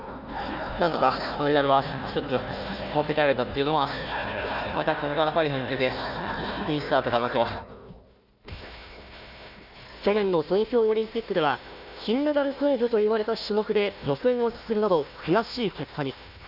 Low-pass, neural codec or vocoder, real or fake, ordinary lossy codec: 5.4 kHz; codec, 16 kHz, 1 kbps, FunCodec, trained on Chinese and English, 50 frames a second; fake; none